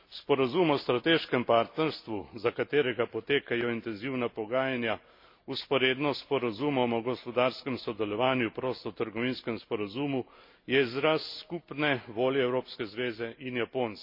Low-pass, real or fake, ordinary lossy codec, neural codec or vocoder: 5.4 kHz; real; MP3, 32 kbps; none